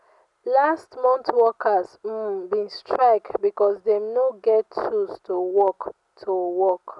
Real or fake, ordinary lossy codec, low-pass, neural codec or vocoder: real; none; 10.8 kHz; none